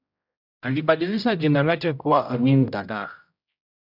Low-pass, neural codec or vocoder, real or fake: 5.4 kHz; codec, 16 kHz, 0.5 kbps, X-Codec, HuBERT features, trained on general audio; fake